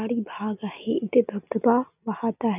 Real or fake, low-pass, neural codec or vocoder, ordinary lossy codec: real; 3.6 kHz; none; none